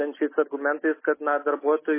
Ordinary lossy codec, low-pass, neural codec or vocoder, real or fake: MP3, 16 kbps; 3.6 kHz; none; real